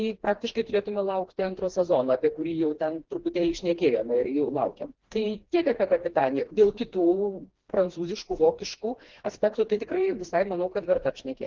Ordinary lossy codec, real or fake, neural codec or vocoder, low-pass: Opus, 16 kbps; fake; codec, 16 kHz, 2 kbps, FreqCodec, smaller model; 7.2 kHz